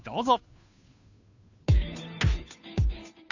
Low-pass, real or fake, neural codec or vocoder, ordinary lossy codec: 7.2 kHz; fake; codec, 16 kHz, 2 kbps, FunCodec, trained on Chinese and English, 25 frames a second; none